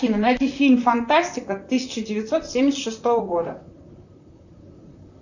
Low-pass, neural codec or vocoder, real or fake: 7.2 kHz; vocoder, 44.1 kHz, 128 mel bands, Pupu-Vocoder; fake